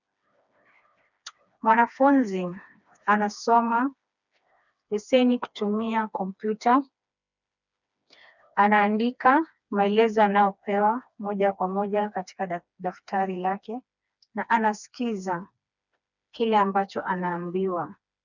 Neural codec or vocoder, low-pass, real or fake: codec, 16 kHz, 2 kbps, FreqCodec, smaller model; 7.2 kHz; fake